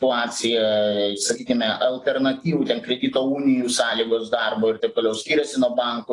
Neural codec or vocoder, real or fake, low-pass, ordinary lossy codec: none; real; 10.8 kHz; AAC, 32 kbps